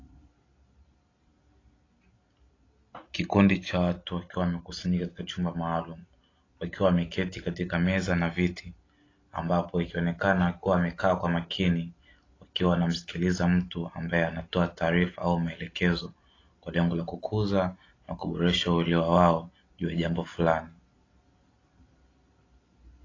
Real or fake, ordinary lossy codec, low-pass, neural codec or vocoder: real; AAC, 32 kbps; 7.2 kHz; none